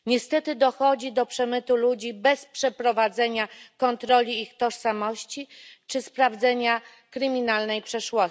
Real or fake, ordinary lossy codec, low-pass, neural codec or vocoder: real; none; none; none